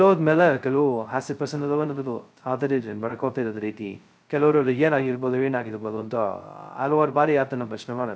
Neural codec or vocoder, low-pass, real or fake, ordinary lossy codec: codec, 16 kHz, 0.2 kbps, FocalCodec; none; fake; none